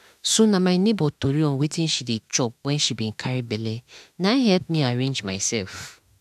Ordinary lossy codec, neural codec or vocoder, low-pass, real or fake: none; autoencoder, 48 kHz, 32 numbers a frame, DAC-VAE, trained on Japanese speech; 14.4 kHz; fake